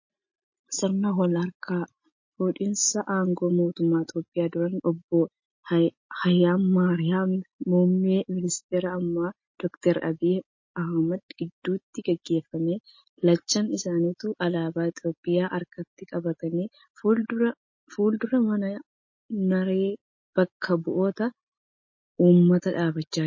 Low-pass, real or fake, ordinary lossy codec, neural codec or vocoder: 7.2 kHz; real; MP3, 32 kbps; none